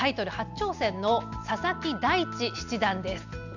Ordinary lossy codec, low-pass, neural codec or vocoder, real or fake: none; 7.2 kHz; none; real